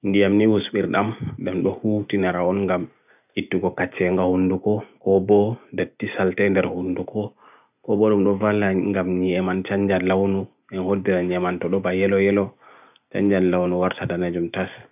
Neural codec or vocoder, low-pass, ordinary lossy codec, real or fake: none; 3.6 kHz; none; real